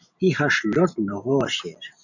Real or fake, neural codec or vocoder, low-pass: fake; vocoder, 44.1 kHz, 128 mel bands every 512 samples, BigVGAN v2; 7.2 kHz